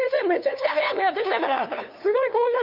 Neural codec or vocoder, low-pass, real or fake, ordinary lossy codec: codec, 16 kHz, 2 kbps, FunCodec, trained on LibriTTS, 25 frames a second; 5.4 kHz; fake; none